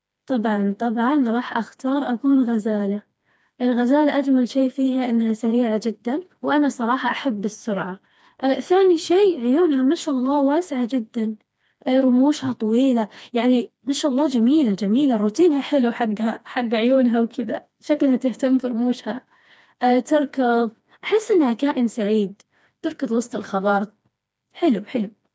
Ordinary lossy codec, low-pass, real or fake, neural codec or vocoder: none; none; fake; codec, 16 kHz, 2 kbps, FreqCodec, smaller model